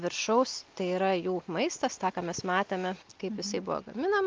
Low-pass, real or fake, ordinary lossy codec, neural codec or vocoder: 7.2 kHz; real; Opus, 24 kbps; none